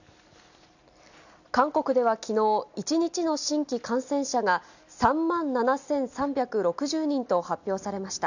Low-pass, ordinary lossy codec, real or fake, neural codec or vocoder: 7.2 kHz; none; real; none